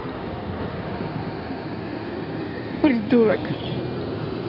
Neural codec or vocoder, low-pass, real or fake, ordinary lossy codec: codec, 16 kHz in and 24 kHz out, 2.2 kbps, FireRedTTS-2 codec; 5.4 kHz; fake; none